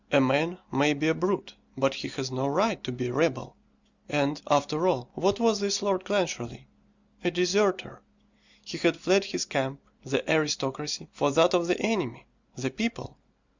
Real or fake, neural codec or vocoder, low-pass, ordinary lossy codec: real; none; 7.2 kHz; Opus, 64 kbps